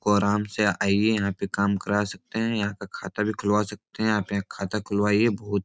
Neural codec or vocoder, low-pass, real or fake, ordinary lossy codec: none; none; real; none